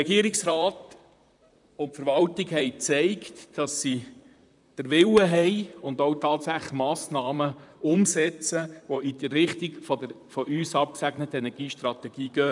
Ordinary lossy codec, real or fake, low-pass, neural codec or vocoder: none; fake; 10.8 kHz; vocoder, 44.1 kHz, 128 mel bands, Pupu-Vocoder